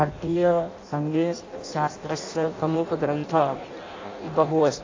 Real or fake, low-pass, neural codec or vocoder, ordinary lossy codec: fake; 7.2 kHz; codec, 16 kHz in and 24 kHz out, 0.6 kbps, FireRedTTS-2 codec; AAC, 32 kbps